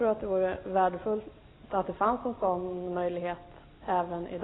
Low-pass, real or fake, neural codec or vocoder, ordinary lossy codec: 7.2 kHz; real; none; AAC, 16 kbps